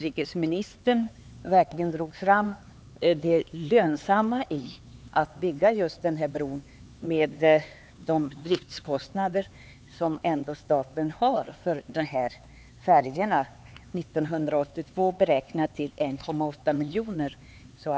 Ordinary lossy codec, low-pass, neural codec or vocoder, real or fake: none; none; codec, 16 kHz, 4 kbps, X-Codec, HuBERT features, trained on LibriSpeech; fake